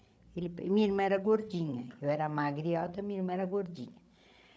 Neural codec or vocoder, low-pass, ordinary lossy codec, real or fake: codec, 16 kHz, 8 kbps, FreqCodec, larger model; none; none; fake